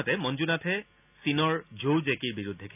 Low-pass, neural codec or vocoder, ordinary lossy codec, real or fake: 3.6 kHz; none; none; real